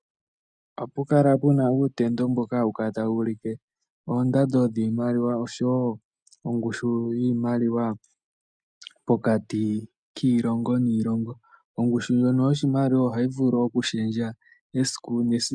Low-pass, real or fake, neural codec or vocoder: 9.9 kHz; real; none